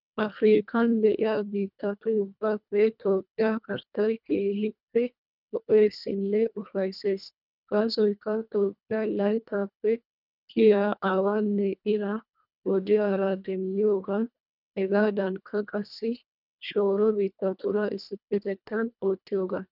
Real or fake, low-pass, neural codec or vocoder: fake; 5.4 kHz; codec, 24 kHz, 1.5 kbps, HILCodec